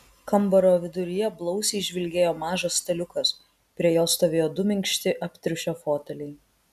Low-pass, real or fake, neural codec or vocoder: 14.4 kHz; real; none